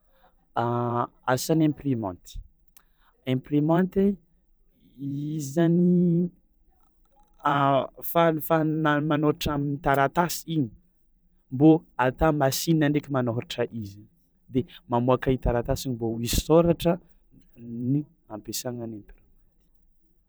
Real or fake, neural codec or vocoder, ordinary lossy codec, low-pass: fake; vocoder, 44.1 kHz, 128 mel bands every 512 samples, BigVGAN v2; none; none